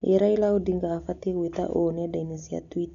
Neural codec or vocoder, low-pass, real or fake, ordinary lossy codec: none; 7.2 kHz; real; none